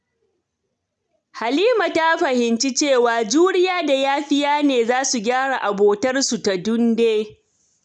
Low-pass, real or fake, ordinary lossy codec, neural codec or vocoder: 9.9 kHz; real; none; none